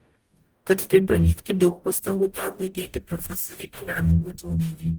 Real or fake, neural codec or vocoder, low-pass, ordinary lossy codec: fake; codec, 44.1 kHz, 0.9 kbps, DAC; 19.8 kHz; Opus, 32 kbps